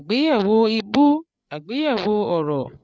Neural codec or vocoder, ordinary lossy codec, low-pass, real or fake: codec, 16 kHz, 8 kbps, FreqCodec, larger model; none; none; fake